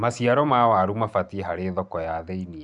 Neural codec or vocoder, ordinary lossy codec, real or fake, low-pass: none; MP3, 96 kbps; real; 10.8 kHz